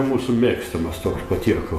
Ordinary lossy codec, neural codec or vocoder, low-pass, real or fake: MP3, 64 kbps; vocoder, 48 kHz, 128 mel bands, Vocos; 14.4 kHz; fake